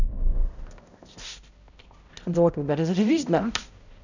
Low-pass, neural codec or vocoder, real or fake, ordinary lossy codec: 7.2 kHz; codec, 16 kHz, 0.5 kbps, X-Codec, HuBERT features, trained on balanced general audio; fake; none